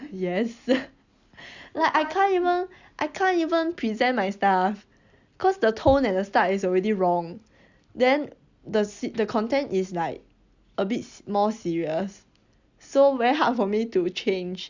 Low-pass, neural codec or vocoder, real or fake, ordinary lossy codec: 7.2 kHz; none; real; Opus, 64 kbps